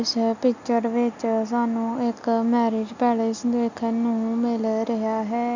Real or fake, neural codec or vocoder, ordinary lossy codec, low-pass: real; none; none; 7.2 kHz